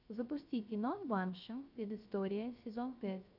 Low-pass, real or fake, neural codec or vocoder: 5.4 kHz; fake; codec, 16 kHz, 0.3 kbps, FocalCodec